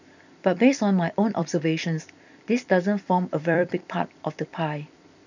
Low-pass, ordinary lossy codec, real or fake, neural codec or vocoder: 7.2 kHz; none; fake; vocoder, 44.1 kHz, 128 mel bands every 256 samples, BigVGAN v2